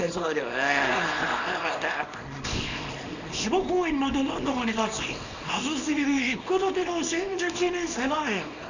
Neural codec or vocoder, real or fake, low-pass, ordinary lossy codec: codec, 24 kHz, 0.9 kbps, WavTokenizer, small release; fake; 7.2 kHz; none